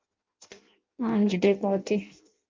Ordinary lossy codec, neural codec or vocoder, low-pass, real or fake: Opus, 32 kbps; codec, 16 kHz in and 24 kHz out, 0.6 kbps, FireRedTTS-2 codec; 7.2 kHz; fake